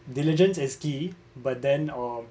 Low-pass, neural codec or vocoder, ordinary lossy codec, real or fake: none; none; none; real